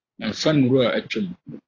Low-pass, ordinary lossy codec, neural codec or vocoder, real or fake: 7.2 kHz; AAC, 32 kbps; vocoder, 44.1 kHz, 128 mel bands every 512 samples, BigVGAN v2; fake